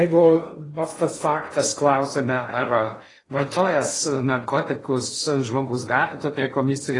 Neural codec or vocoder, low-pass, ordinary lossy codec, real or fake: codec, 16 kHz in and 24 kHz out, 0.6 kbps, FocalCodec, streaming, 4096 codes; 10.8 kHz; AAC, 32 kbps; fake